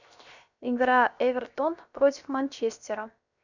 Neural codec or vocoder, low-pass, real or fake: codec, 16 kHz, 0.7 kbps, FocalCodec; 7.2 kHz; fake